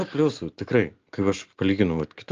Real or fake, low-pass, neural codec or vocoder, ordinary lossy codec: real; 7.2 kHz; none; Opus, 24 kbps